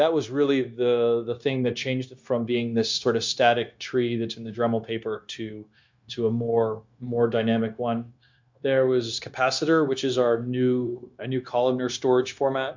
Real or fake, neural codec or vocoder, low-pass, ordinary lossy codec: fake; codec, 16 kHz, 0.9 kbps, LongCat-Audio-Codec; 7.2 kHz; MP3, 64 kbps